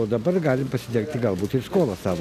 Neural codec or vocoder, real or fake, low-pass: none; real; 14.4 kHz